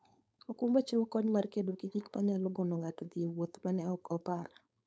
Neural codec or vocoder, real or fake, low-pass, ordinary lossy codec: codec, 16 kHz, 4.8 kbps, FACodec; fake; none; none